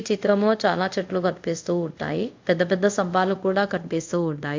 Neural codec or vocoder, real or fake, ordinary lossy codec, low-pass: codec, 24 kHz, 0.5 kbps, DualCodec; fake; MP3, 64 kbps; 7.2 kHz